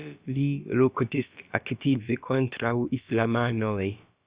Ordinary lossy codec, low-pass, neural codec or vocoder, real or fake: Opus, 64 kbps; 3.6 kHz; codec, 16 kHz, about 1 kbps, DyCAST, with the encoder's durations; fake